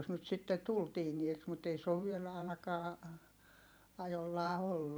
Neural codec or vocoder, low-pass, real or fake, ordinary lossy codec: vocoder, 44.1 kHz, 128 mel bands every 512 samples, BigVGAN v2; none; fake; none